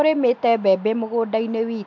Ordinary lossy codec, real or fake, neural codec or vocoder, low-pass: MP3, 64 kbps; real; none; 7.2 kHz